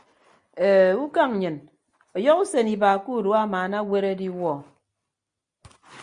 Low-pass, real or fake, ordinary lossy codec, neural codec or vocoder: 9.9 kHz; real; Opus, 64 kbps; none